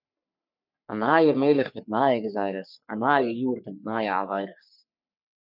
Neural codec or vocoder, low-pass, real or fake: codec, 44.1 kHz, 3.4 kbps, Pupu-Codec; 5.4 kHz; fake